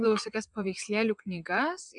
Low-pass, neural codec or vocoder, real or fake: 9.9 kHz; vocoder, 22.05 kHz, 80 mel bands, WaveNeXt; fake